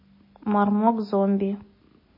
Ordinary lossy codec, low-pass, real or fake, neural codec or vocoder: MP3, 24 kbps; 5.4 kHz; real; none